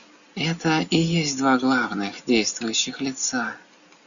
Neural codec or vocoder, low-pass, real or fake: none; 7.2 kHz; real